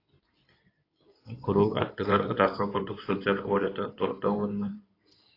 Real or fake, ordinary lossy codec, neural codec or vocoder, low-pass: fake; AAC, 32 kbps; codec, 16 kHz in and 24 kHz out, 2.2 kbps, FireRedTTS-2 codec; 5.4 kHz